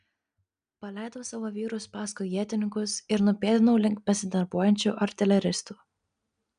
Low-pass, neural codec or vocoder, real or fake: 9.9 kHz; none; real